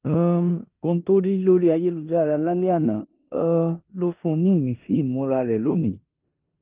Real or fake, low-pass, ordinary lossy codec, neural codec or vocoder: fake; 3.6 kHz; Opus, 32 kbps; codec, 16 kHz in and 24 kHz out, 0.9 kbps, LongCat-Audio-Codec, four codebook decoder